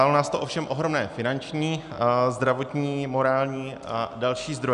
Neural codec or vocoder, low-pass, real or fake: none; 10.8 kHz; real